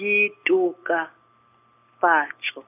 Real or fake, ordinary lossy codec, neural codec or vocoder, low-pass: real; none; none; 3.6 kHz